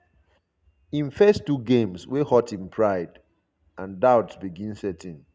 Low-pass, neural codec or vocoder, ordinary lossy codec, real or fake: none; none; none; real